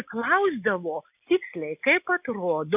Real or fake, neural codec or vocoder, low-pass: real; none; 3.6 kHz